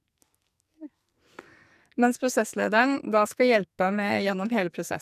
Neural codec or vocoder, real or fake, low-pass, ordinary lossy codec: codec, 44.1 kHz, 2.6 kbps, SNAC; fake; 14.4 kHz; none